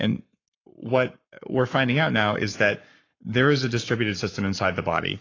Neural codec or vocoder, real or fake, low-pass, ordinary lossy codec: codec, 44.1 kHz, 7.8 kbps, Pupu-Codec; fake; 7.2 kHz; AAC, 32 kbps